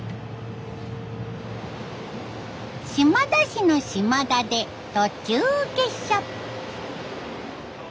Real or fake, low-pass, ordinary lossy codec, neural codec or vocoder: real; none; none; none